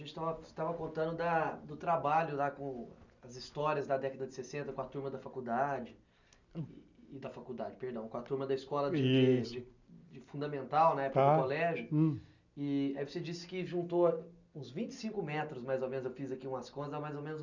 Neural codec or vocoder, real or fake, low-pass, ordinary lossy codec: none; real; 7.2 kHz; none